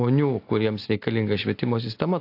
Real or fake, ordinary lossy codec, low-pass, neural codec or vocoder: real; AAC, 32 kbps; 5.4 kHz; none